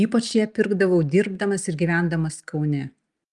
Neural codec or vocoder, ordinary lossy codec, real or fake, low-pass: none; Opus, 32 kbps; real; 10.8 kHz